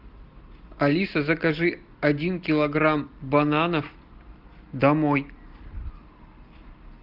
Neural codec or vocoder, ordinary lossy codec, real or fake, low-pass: none; Opus, 32 kbps; real; 5.4 kHz